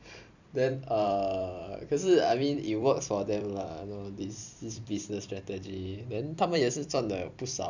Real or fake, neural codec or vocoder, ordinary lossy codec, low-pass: fake; vocoder, 44.1 kHz, 128 mel bands every 256 samples, BigVGAN v2; none; 7.2 kHz